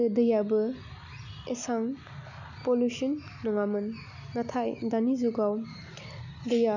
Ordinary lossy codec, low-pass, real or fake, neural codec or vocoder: none; 7.2 kHz; real; none